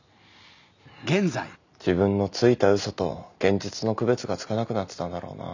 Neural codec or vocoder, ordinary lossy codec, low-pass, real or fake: none; none; 7.2 kHz; real